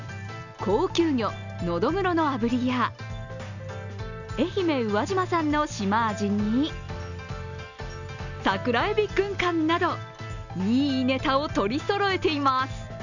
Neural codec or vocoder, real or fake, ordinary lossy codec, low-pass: none; real; none; 7.2 kHz